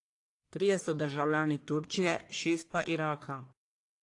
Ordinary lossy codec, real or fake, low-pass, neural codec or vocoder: AAC, 48 kbps; fake; 10.8 kHz; codec, 44.1 kHz, 1.7 kbps, Pupu-Codec